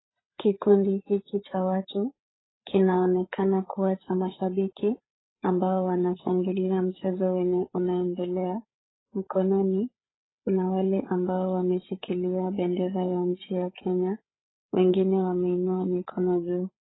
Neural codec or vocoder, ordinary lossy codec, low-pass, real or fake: codec, 16 kHz, 8 kbps, FreqCodec, larger model; AAC, 16 kbps; 7.2 kHz; fake